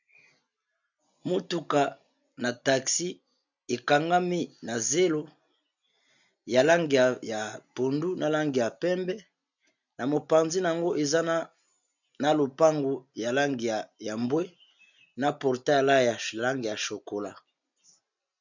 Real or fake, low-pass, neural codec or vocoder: real; 7.2 kHz; none